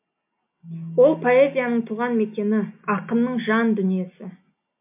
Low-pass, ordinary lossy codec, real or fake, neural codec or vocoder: 3.6 kHz; MP3, 32 kbps; real; none